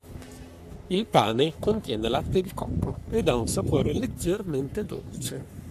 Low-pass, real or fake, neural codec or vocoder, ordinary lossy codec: 14.4 kHz; fake; codec, 44.1 kHz, 3.4 kbps, Pupu-Codec; AAC, 96 kbps